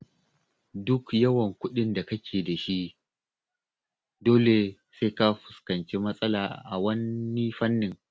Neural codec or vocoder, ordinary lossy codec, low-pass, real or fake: none; none; none; real